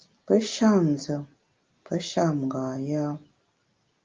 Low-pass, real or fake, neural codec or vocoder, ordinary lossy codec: 7.2 kHz; real; none; Opus, 32 kbps